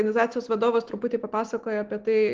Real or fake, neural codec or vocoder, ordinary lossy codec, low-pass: real; none; Opus, 16 kbps; 7.2 kHz